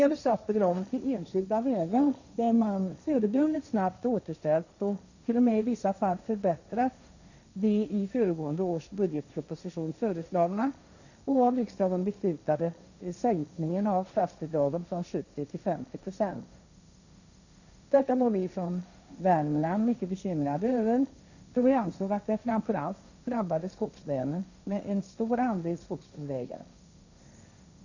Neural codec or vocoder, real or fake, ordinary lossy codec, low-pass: codec, 16 kHz, 1.1 kbps, Voila-Tokenizer; fake; none; 7.2 kHz